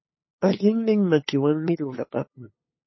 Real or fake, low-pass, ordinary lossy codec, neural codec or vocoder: fake; 7.2 kHz; MP3, 24 kbps; codec, 16 kHz, 8 kbps, FunCodec, trained on LibriTTS, 25 frames a second